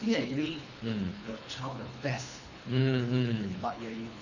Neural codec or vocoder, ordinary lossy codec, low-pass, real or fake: codec, 24 kHz, 3 kbps, HILCodec; none; 7.2 kHz; fake